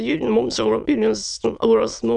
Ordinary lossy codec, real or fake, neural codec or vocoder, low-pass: Opus, 64 kbps; fake; autoencoder, 22.05 kHz, a latent of 192 numbers a frame, VITS, trained on many speakers; 9.9 kHz